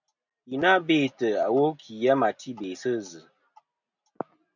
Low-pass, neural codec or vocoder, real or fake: 7.2 kHz; none; real